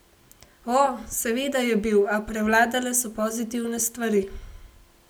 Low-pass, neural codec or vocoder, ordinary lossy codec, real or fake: none; none; none; real